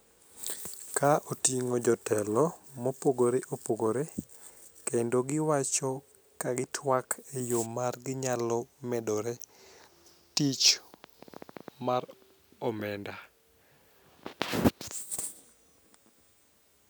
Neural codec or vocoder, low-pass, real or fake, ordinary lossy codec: none; none; real; none